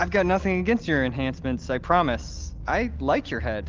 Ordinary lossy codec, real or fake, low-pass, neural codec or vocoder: Opus, 32 kbps; real; 7.2 kHz; none